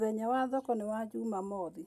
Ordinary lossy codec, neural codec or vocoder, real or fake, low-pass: none; none; real; 14.4 kHz